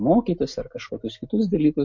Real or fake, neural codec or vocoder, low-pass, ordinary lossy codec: real; none; 7.2 kHz; MP3, 48 kbps